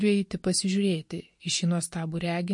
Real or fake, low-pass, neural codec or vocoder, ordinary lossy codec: real; 10.8 kHz; none; MP3, 48 kbps